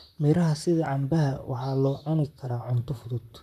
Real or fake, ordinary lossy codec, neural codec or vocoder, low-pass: fake; none; vocoder, 44.1 kHz, 128 mel bands, Pupu-Vocoder; 14.4 kHz